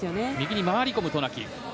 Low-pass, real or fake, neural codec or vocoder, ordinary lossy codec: none; real; none; none